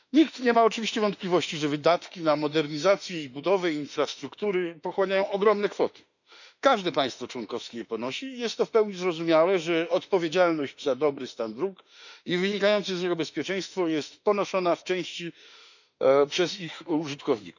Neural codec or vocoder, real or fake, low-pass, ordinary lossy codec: autoencoder, 48 kHz, 32 numbers a frame, DAC-VAE, trained on Japanese speech; fake; 7.2 kHz; none